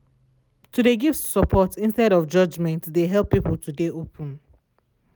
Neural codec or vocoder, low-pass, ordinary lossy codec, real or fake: none; none; none; real